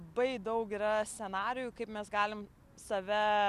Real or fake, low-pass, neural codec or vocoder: real; 14.4 kHz; none